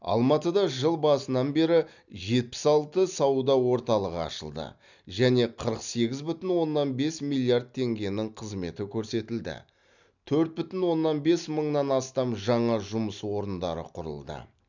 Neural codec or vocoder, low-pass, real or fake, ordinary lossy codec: none; 7.2 kHz; real; none